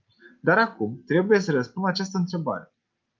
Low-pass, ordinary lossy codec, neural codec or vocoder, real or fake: 7.2 kHz; Opus, 32 kbps; none; real